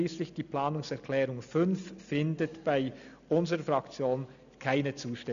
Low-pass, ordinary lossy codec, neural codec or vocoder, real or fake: 7.2 kHz; none; none; real